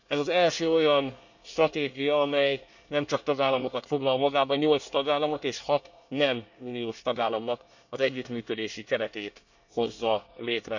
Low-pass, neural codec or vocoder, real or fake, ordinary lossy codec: 7.2 kHz; codec, 24 kHz, 1 kbps, SNAC; fake; none